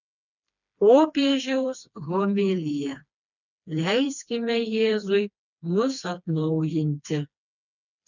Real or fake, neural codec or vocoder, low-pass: fake; codec, 16 kHz, 2 kbps, FreqCodec, smaller model; 7.2 kHz